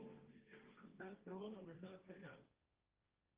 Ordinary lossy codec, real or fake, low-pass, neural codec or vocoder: Opus, 32 kbps; fake; 3.6 kHz; codec, 16 kHz, 1.1 kbps, Voila-Tokenizer